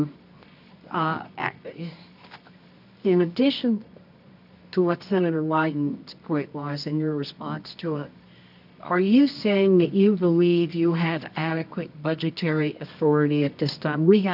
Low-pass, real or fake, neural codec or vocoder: 5.4 kHz; fake; codec, 24 kHz, 0.9 kbps, WavTokenizer, medium music audio release